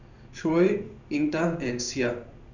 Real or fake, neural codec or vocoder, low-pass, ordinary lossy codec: fake; codec, 16 kHz in and 24 kHz out, 1 kbps, XY-Tokenizer; 7.2 kHz; none